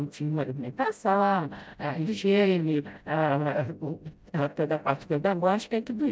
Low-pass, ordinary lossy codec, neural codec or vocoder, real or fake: none; none; codec, 16 kHz, 0.5 kbps, FreqCodec, smaller model; fake